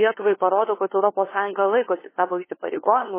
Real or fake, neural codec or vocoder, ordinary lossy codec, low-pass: fake; codec, 16 kHz, 2 kbps, FunCodec, trained on LibriTTS, 25 frames a second; MP3, 16 kbps; 3.6 kHz